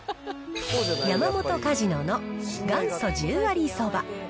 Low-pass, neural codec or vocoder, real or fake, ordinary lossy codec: none; none; real; none